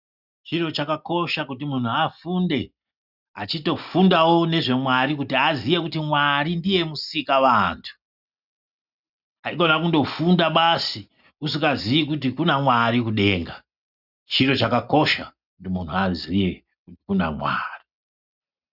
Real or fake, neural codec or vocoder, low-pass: real; none; 5.4 kHz